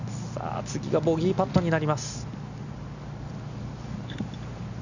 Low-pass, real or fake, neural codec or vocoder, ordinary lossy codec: 7.2 kHz; real; none; none